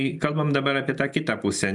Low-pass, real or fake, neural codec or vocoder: 10.8 kHz; real; none